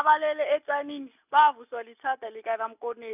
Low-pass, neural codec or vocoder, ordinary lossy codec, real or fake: 3.6 kHz; none; none; real